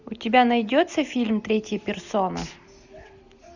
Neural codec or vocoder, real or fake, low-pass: none; real; 7.2 kHz